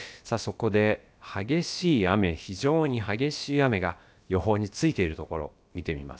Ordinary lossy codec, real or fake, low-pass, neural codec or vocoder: none; fake; none; codec, 16 kHz, about 1 kbps, DyCAST, with the encoder's durations